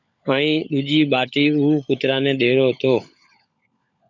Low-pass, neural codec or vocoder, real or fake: 7.2 kHz; codec, 16 kHz, 16 kbps, FunCodec, trained on LibriTTS, 50 frames a second; fake